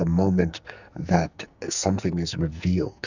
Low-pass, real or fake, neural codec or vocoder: 7.2 kHz; fake; codec, 44.1 kHz, 2.6 kbps, SNAC